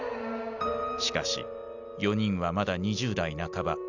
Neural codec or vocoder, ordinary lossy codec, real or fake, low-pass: none; none; real; 7.2 kHz